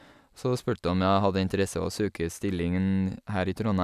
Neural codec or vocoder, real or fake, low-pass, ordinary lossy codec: none; real; 14.4 kHz; none